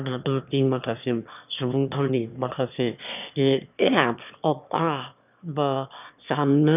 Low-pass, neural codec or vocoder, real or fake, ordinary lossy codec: 3.6 kHz; autoencoder, 22.05 kHz, a latent of 192 numbers a frame, VITS, trained on one speaker; fake; none